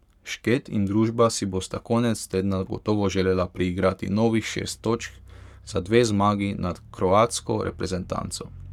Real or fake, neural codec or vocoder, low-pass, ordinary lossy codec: fake; codec, 44.1 kHz, 7.8 kbps, Pupu-Codec; 19.8 kHz; none